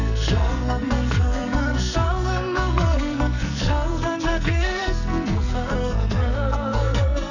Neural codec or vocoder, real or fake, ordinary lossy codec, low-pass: codec, 44.1 kHz, 2.6 kbps, SNAC; fake; none; 7.2 kHz